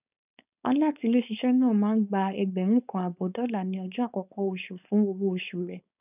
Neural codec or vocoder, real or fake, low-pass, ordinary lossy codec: codec, 16 kHz, 4.8 kbps, FACodec; fake; 3.6 kHz; none